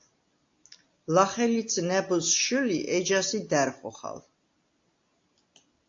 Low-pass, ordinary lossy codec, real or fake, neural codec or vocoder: 7.2 kHz; AAC, 48 kbps; real; none